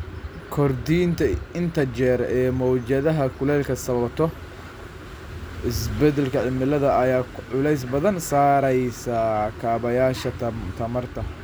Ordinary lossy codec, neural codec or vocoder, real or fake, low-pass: none; none; real; none